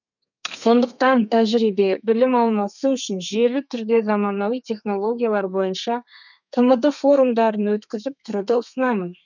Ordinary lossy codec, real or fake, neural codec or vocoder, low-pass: none; fake; codec, 32 kHz, 1.9 kbps, SNAC; 7.2 kHz